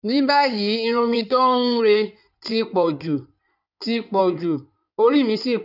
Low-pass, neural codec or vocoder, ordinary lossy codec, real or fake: 5.4 kHz; codec, 16 kHz in and 24 kHz out, 2.2 kbps, FireRedTTS-2 codec; none; fake